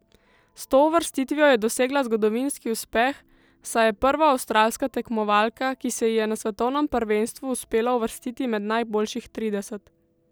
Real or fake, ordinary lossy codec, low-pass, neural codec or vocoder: real; none; none; none